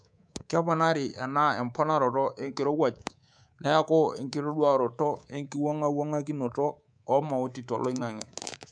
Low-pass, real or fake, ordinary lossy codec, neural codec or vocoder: 9.9 kHz; fake; none; codec, 24 kHz, 3.1 kbps, DualCodec